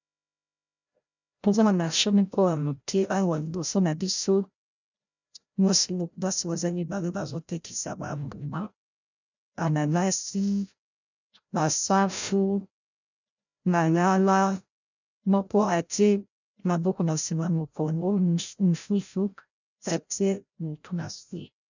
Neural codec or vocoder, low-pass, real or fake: codec, 16 kHz, 0.5 kbps, FreqCodec, larger model; 7.2 kHz; fake